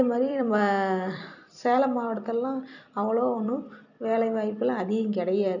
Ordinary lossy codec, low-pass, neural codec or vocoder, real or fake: none; 7.2 kHz; vocoder, 44.1 kHz, 128 mel bands every 256 samples, BigVGAN v2; fake